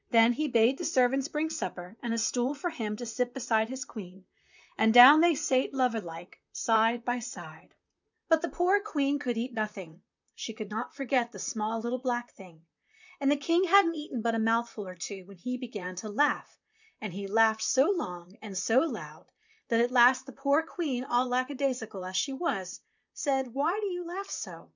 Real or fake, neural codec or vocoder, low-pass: fake; vocoder, 44.1 kHz, 128 mel bands, Pupu-Vocoder; 7.2 kHz